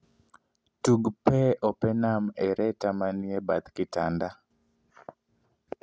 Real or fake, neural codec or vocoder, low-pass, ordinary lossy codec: real; none; none; none